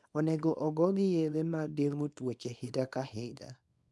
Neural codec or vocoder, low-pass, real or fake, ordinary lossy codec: codec, 24 kHz, 0.9 kbps, WavTokenizer, small release; none; fake; none